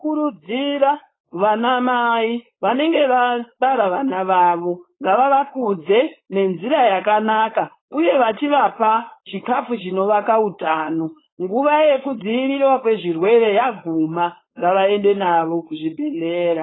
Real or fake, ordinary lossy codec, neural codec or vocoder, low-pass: fake; AAC, 16 kbps; codec, 16 kHz, 4.8 kbps, FACodec; 7.2 kHz